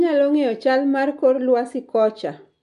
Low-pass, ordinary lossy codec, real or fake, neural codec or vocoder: 10.8 kHz; MP3, 64 kbps; real; none